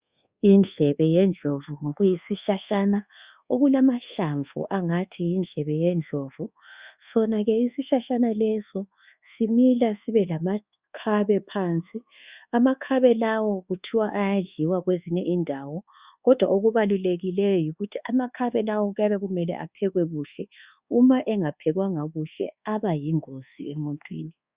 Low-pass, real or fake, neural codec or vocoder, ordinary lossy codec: 3.6 kHz; fake; codec, 24 kHz, 1.2 kbps, DualCodec; Opus, 64 kbps